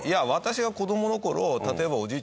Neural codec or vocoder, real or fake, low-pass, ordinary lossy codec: none; real; none; none